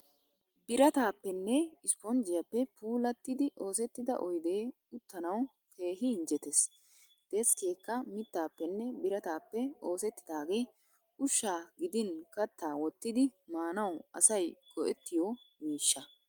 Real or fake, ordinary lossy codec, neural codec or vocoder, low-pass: real; Opus, 32 kbps; none; 19.8 kHz